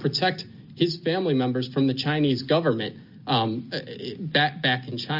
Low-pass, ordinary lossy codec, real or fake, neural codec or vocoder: 5.4 kHz; AAC, 48 kbps; real; none